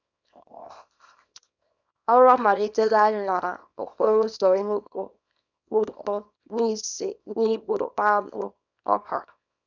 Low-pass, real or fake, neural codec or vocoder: 7.2 kHz; fake; codec, 24 kHz, 0.9 kbps, WavTokenizer, small release